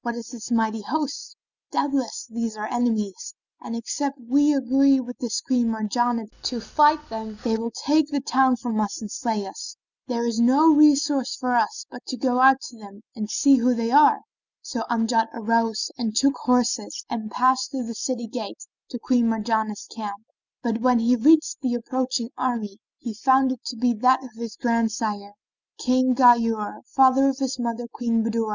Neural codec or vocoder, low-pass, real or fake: none; 7.2 kHz; real